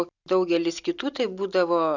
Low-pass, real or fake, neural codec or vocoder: 7.2 kHz; real; none